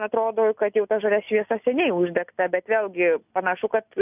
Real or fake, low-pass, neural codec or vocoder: real; 3.6 kHz; none